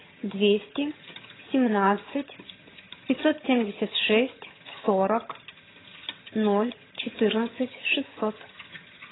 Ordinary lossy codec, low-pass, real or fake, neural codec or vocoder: AAC, 16 kbps; 7.2 kHz; fake; vocoder, 22.05 kHz, 80 mel bands, HiFi-GAN